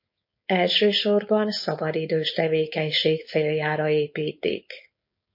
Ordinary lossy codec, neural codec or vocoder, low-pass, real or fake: MP3, 32 kbps; codec, 16 kHz, 4.8 kbps, FACodec; 5.4 kHz; fake